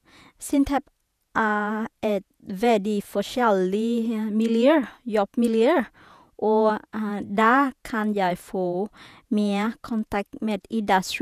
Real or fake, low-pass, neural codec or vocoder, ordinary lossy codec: fake; 14.4 kHz; vocoder, 48 kHz, 128 mel bands, Vocos; none